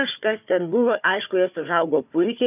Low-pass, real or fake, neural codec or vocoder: 3.6 kHz; fake; codec, 16 kHz, 4 kbps, FunCodec, trained on Chinese and English, 50 frames a second